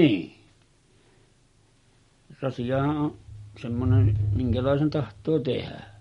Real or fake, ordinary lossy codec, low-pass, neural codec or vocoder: real; MP3, 48 kbps; 10.8 kHz; none